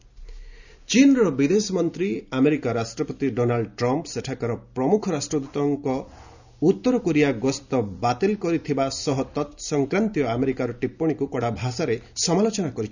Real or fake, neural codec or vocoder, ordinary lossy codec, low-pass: real; none; none; 7.2 kHz